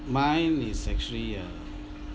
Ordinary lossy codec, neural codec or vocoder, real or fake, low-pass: none; none; real; none